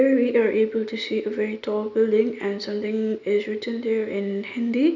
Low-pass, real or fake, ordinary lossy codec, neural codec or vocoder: 7.2 kHz; real; none; none